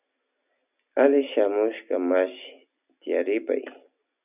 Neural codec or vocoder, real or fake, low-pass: none; real; 3.6 kHz